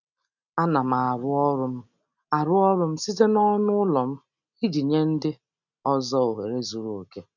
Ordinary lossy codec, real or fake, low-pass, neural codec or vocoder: none; real; 7.2 kHz; none